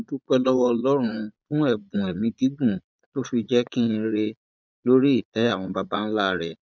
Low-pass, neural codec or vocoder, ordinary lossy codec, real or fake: 7.2 kHz; vocoder, 22.05 kHz, 80 mel bands, Vocos; none; fake